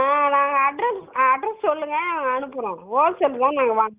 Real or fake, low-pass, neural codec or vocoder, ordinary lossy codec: real; 3.6 kHz; none; Opus, 24 kbps